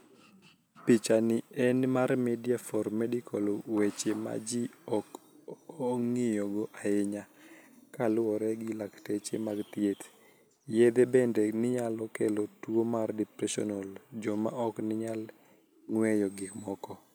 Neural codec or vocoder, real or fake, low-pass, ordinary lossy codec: none; real; none; none